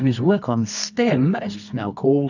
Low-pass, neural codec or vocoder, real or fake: 7.2 kHz; codec, 24 kHz, 0.9 kbps, WavTokenizer, medium music audio release; fake